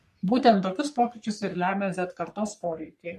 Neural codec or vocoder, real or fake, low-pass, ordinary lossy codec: codec, 44.1 kHz, 3.4 kbps, Pupu-Codec; fake; 14.4 kHz; MP3, 64 kbps